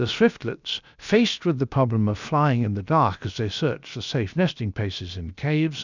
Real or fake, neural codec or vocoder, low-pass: fake; codec, 16 kHz, 0.7 kbps, FocalCodec; 7.2 kHz